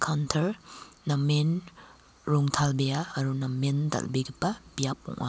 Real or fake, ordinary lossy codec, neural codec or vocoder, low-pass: real; none; none; none